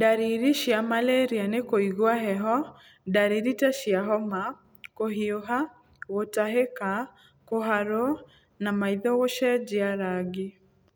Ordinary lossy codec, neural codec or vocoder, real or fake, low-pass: none; none; real; none